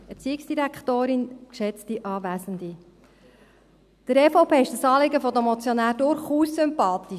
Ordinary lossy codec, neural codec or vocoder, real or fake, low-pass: none; none; real; 14.4 kHz